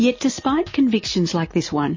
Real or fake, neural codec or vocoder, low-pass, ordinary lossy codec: real; none; 7.2 kHz; MP3, 32 kbps